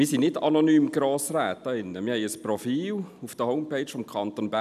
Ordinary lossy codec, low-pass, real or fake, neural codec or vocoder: none; 14.4 kHz; real; none